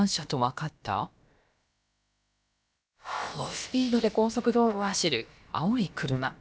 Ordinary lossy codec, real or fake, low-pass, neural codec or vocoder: none; fake; none; codec, 16 kHz, about 1 kbps, DyCAST, with the encoder's durations